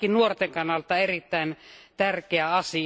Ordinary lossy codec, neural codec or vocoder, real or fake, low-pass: none; none; real; none